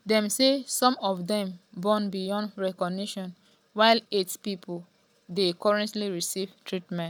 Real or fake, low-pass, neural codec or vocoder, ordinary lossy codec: real; none; none; none